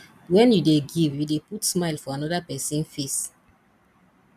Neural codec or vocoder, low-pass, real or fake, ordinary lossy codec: vocoder, 44.1 kHz, 128 mel bands every 256 samples, BigVGAN v2; 14.4 kHz; fake; none